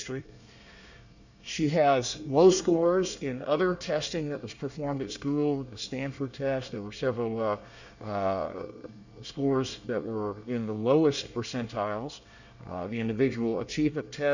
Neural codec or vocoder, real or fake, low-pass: codec, 24 kHz, 1 kbps, SNAC; fake; 7.2 kHz